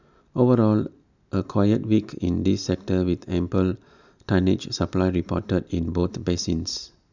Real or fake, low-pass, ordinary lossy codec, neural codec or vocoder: real; 7.2 kHz; none; none